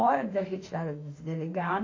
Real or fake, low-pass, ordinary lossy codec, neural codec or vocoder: fake; 7.2 kHz; AAC, 32 kbps; autoencoder, 48 kHz, 32 numbers a frame, DAC-VAE, trained on Japanese speech